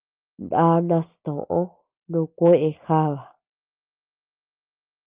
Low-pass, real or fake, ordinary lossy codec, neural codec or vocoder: 3.6 kHz; real; Opus, 24 kbps; none